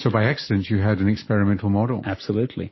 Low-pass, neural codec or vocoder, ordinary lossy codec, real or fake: 7.2 kHz; none; MP3, 24 kbps; real